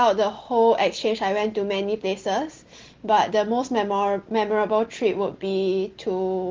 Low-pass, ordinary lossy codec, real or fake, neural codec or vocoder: 7.2 kHz; Opus, 16 kbps; real; none